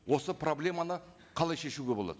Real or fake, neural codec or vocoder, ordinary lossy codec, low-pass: real; none; none; none